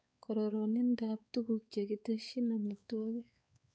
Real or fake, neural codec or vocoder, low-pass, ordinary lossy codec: fake; codec, 16 kHz, 4 kbps, X-Codec, WavLM features, trained on Multilingual LibriSpeech; none; none